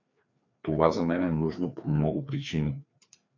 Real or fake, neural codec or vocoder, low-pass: fake; codec, 16 kHz, 2 kbps, FreqCodec, larger model; 7.2 kHz